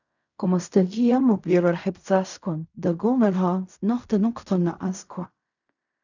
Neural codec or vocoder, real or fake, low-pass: codec, 16 kHz in and 24 kHz out, 0.4 kbps, LongCat-Audio-Codec, fine tuned four codebook decoder; fake; 7.2 kHz